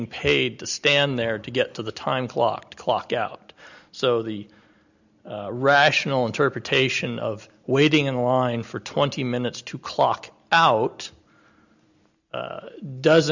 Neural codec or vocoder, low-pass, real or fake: none; 7.2 kHz; real